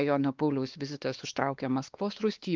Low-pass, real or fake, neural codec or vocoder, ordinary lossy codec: 7.2 kHz; fake; codec, 16 kHz, 2 kbps, X-Codec, WavLM features, trained on Multilingual LibriSpeech; Opus, 24 kbps